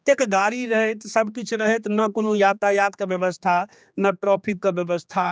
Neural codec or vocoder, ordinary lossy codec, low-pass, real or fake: codec, 16 kHz, 2 kbps, X-Codec, HuBERT features, trained on general audio; none; none; fake